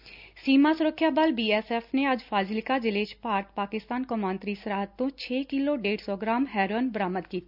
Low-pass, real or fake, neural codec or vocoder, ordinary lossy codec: 5.4 kHz; real; none; none